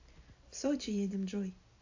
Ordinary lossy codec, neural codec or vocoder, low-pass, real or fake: AAC, 48 kbps; none; 7.2 kHz; real